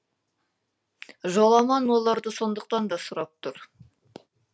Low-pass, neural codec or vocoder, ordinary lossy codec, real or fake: none; none; none; real